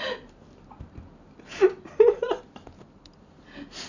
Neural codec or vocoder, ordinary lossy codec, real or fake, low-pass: none; none; real; 7.2 kHz